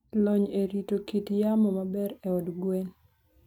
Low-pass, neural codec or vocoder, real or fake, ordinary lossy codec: 19.8 kHz; none; real; none